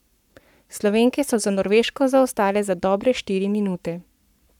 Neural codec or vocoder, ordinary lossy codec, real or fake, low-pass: codec, 44.1 kHz, 7.8 kbps, Pupu-Codec; none; fake; 19.8 kHz